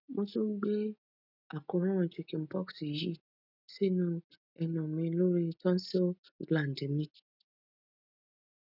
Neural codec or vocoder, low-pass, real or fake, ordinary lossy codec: none; 5.4 kHz; real; none